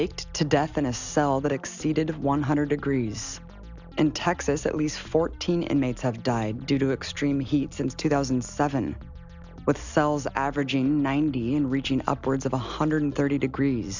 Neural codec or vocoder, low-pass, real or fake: none; 7.2 kHz; real